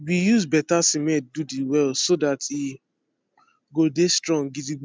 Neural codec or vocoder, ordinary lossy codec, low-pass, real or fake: none; none; none; real